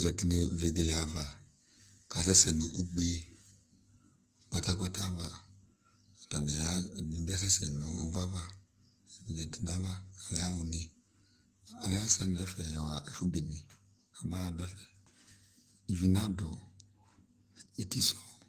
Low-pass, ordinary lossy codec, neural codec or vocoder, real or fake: 14.4 kHz; Opus, 24 kbps; codec, 44.1 kHz, 2.6 kbps, SNAC; fake